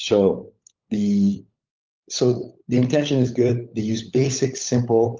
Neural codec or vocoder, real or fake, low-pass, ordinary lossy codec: codec, 16 kHz, 16 kbps, FunCodec, trained on LibriTTS, 50 frames a second; fake; 7.2 kHz; Opus, 24 kbps